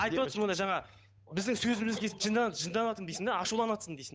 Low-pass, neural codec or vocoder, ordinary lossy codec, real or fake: none; codec, 16 kHz, 8 kbps, FunCodec, trained on Chinese and English, 25 frames a second; none; fake